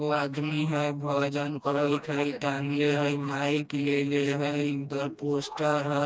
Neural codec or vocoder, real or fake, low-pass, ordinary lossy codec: codec, 16 kHz, 1 kbps, FreqCodec, smaller model; fake; none; none